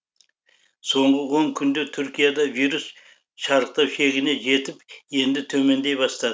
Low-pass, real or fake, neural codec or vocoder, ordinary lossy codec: none; real; none; none